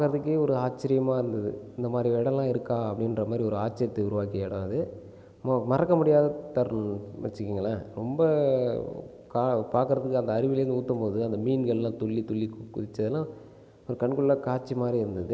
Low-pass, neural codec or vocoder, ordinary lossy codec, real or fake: none; none; none; real